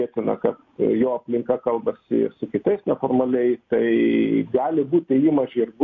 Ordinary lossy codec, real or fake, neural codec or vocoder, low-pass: MP3, 48 kbps; real; none; 7.2 kHz